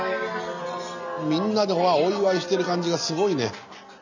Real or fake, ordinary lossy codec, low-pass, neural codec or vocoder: real; none; 7.2 kHz; none